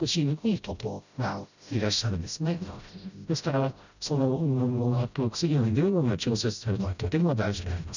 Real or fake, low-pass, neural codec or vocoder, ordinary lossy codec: fake; 7.2 kHz; codec, 16 kHz, 0.5 kbps, FreqCodec, smaller model; none